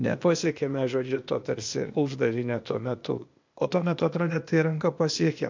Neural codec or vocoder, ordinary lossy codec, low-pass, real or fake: codec, 16 kHz, 0.8 kbps, ZipCodec; MP3, 64 kbps; 7.2 kHz; fake